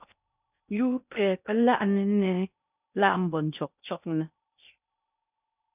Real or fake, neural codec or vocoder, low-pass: fake; codec, 16 kHz in and 24 kHz out, 0.6 kbps, FocalCodec, streaming, 4096 codes; 3.6 kHz